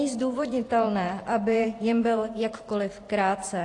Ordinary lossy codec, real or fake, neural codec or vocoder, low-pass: AAC, 48 kbps; fake; vocoder, 44.1 kHz, 128 mel bands every 512 samples, BigVGAN v2; 10.8 kHz